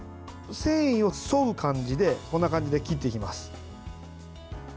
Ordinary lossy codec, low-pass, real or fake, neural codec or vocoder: none; none; real; none